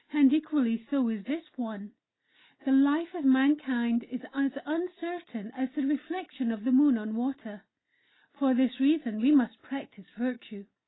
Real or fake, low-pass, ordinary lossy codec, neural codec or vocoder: real; 7.2 kHz; AAC, 16 kbps; none